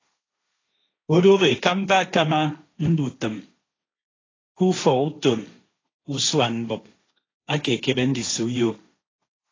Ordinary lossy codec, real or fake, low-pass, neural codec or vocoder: AAC, 32 kbps; fake; 7.2 kHz; codec, 16 kHz, 1.1 kbps, Voila-Tokenizer